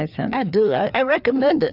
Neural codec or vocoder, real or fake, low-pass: codec, 16 kHz, 4 kbps, FreqCodec, larger model; fake; 5.4 kHz